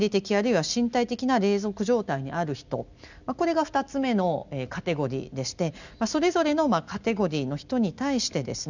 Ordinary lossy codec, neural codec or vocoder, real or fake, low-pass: none; none; real; 7.2 kHz